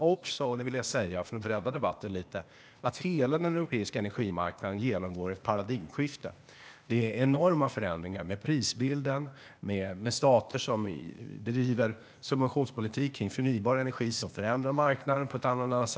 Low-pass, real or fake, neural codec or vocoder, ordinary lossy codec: none; fake; codec, 16 kHz, 0.8 kbps, ZipCodec; none